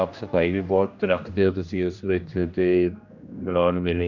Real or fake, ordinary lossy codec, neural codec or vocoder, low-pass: fake; none; codec, 16 kHz, 1 kbps, X-Codec, HuBERT features, trained on general audio; 7.2 kHz